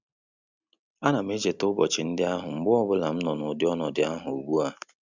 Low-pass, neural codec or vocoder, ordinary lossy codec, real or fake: 7.2 kHz; none; Opus, 64 kbps; real